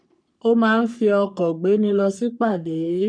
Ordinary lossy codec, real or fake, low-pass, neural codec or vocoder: none; fake; 9.9 kHz; codec, 44.1 kHz, 3.4 kbps, Pupu-Codec